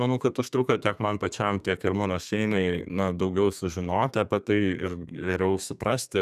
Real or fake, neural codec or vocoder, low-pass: fake; codec, 32 kHz, 1.9 kbps, SNAC; 14.4 kHz